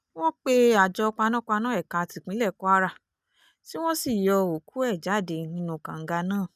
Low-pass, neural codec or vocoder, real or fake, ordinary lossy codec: 14.4 kHz; none; real; none